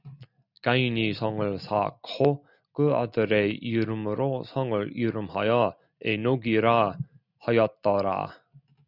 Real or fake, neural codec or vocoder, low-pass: real; none; 5.4 kHz